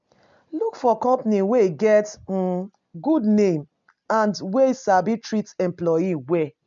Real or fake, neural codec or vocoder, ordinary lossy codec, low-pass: real; none; MP3, 64 kbps; 7.2 kHz